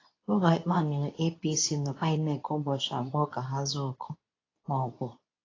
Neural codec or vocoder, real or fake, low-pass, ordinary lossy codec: codec, 24 kHz, 0.9 kbps, WavTokenizer, medium speech release version 2; fake; 7.2 kHz; AAC, 32 kbps